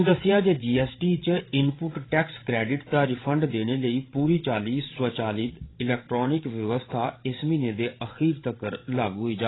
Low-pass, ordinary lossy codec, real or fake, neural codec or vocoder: 7.2 kHz; AAC, 16 kbps; fake; codec, 16 kHz, 16 kbps, FreqCodec, larger model